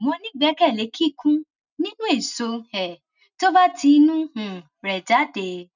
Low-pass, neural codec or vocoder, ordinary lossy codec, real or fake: 7.2 kHz; none; none; real